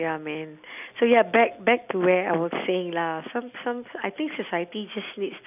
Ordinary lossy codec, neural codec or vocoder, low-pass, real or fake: none; none; 3.6 kHz; real